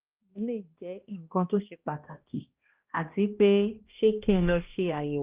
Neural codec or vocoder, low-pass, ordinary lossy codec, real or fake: codec, 16 kHz, 1 kbps, X-Codec, HuBERT features, trained on balanced general audio; 3.6 kHz; Opus, 32 kbps; fake